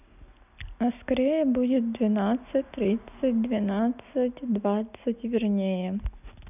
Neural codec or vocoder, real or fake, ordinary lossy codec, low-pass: none; real; none; 3.6 kHz